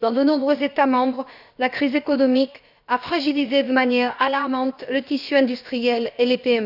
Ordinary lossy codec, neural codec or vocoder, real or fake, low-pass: none; codec, 16 kHz, about 1 kbps, DyCAST, with the encoder's durations; fake; 5.4 kHz